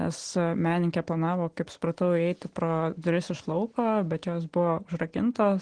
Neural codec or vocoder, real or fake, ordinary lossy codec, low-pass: none; real; Opus, 16 kbps; 9.9 kHz